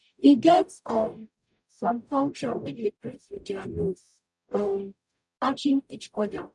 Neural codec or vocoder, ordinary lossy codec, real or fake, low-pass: codec, 44.1 kHz, 0.9 kbps, DAC; none; fake; 10.8 kHz